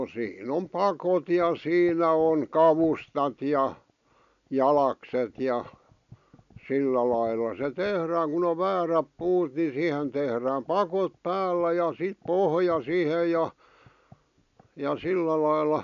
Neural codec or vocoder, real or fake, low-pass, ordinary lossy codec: none; real; 7.2 kHz; none